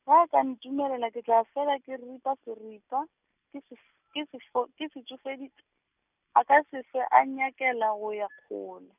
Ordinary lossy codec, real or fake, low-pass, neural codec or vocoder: none; real; 3.6 kHz; none